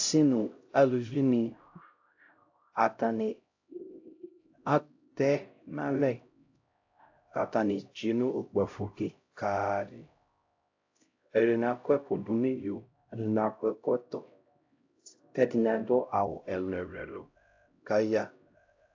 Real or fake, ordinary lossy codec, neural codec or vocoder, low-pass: fake; AAC, 48 kbps; codec, 16 kHz, 0.5 kbps, X-Codec, HuBERT features, trained on LibriSpeech; 7.2 kHz